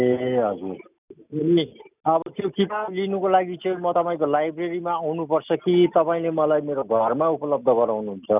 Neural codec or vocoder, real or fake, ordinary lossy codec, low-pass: none; real; none; 3.6 kHz